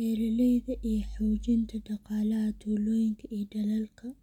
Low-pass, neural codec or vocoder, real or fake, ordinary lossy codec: 19.8 kHz; none; real; none